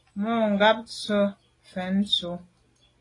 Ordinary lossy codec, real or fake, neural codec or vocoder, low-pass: AAC, 32 kbps; real; none; 10.8 kHz